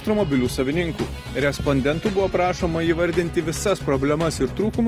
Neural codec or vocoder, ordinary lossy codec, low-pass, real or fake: none; Opus, 24 kbps; 14.4 kHz; real